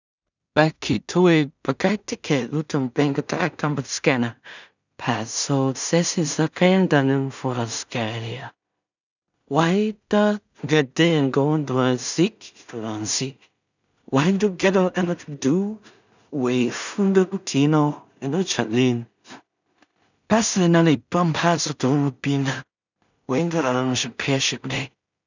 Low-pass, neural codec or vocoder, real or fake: 7.2 kHz; codec, 16 kHz in and 24 kHz out, 0.4 kbps, LongCat-Audio-Codec, two codebook decoder; fake